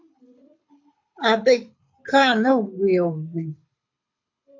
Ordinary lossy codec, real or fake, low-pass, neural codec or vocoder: MP3, 48 kbps; fake; 7.2 kHz; codec, 24 kHz, 6 kbps, HILCodec